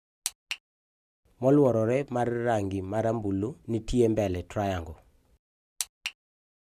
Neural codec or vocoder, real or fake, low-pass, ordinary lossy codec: none; real; 14.4 kHz; none